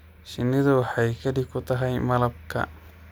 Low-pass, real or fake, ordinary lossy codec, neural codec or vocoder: none; real; none; none